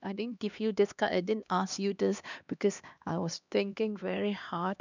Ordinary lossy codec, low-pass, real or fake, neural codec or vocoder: none; 7.2 kHz; fake; codec, 16 kHz, 2 kbps, X-Codec, HuBERT features, trained on LibriSpeech